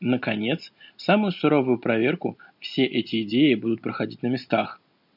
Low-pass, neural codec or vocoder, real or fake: 5.4 kHz; none; real